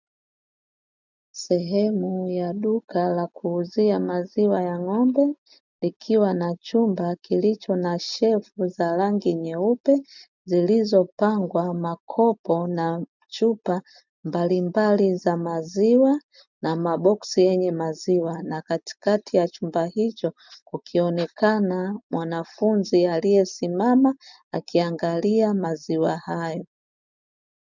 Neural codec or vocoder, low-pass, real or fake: none; 7.2 kHz; real